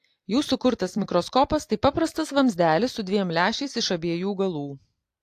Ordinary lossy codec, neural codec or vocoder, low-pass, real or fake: AAC, 64 kbps; vocoder, 44.1 kHz, 128 mel bands every 256 samples, BigVGAN v2; 14.4 kHz; fake